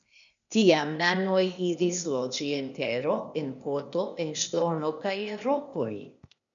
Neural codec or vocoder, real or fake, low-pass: codec, 16 kHz, 0.8 kbps, ZipCodec; fake; 7.2 kHz